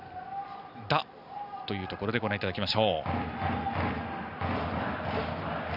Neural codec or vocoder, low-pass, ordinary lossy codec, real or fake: none; 5.4 kHz; none; real